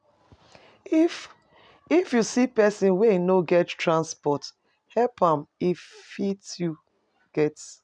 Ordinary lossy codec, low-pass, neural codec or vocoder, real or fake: none; 9.9 kHz; none; real